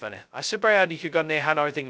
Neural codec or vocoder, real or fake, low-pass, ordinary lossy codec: codec, 16 kHz, 0.2 kbps, FocalCodec; fake; none; none